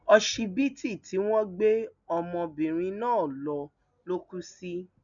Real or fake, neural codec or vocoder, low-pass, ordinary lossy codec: real; none; 7.2 kHz; none